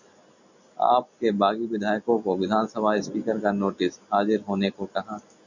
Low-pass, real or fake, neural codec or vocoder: 7.2 kHz; real; none